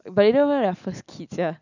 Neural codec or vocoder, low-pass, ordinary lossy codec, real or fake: none; 7.2 kHz; none; real